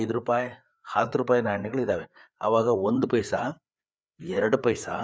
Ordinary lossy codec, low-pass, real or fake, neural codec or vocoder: none; none; fake; codec, 16 kHz, 4 kbps, FreqCodec, larger model